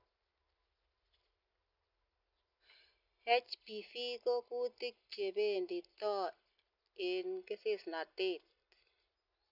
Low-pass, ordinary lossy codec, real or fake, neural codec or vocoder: 5.4 kHz; none; real; none